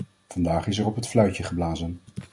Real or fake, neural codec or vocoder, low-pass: real; none; 10.8 kHz